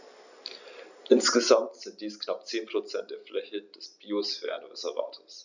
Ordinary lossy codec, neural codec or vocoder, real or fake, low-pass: none; none; real; 7.2 kHz